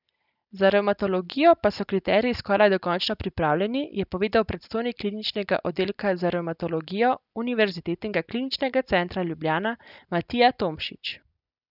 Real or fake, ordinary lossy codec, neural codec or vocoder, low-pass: real; none; none; 5.4 kHz